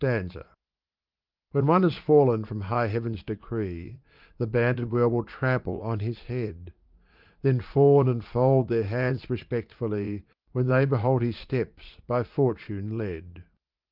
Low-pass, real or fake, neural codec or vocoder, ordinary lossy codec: 5.4 kHz; fake; vocoder, 44.1 kHz, 80 mel bands, Vocos; Opus, 24 kbps